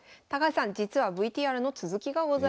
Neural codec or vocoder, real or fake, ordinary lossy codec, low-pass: none; real; none; none